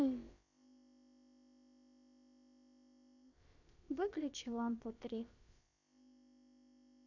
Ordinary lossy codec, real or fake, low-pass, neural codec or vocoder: none; fake; 7.2 kHz; codec, 16 kHz, about 1 kbps, DyCAST, with the encoder's durations